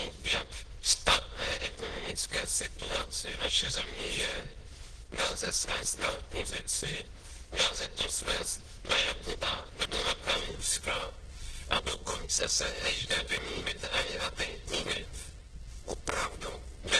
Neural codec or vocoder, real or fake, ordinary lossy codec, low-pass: autoencoder, 22.05 kHz, a latent of 192 numbers a frame, VITS, trained on many speakers; fake; Opus, 16 kbps; 9.9 kHz